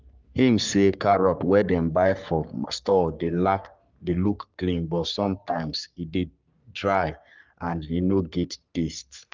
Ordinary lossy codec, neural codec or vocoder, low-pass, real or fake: Opus, 24 kbps; codec, 44.1 kHz, 3.4 kbps, Pupu-Codec; 7.2 kHz; fake